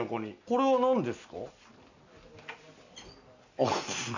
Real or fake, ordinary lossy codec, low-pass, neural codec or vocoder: real; none; 7.2 kHz; none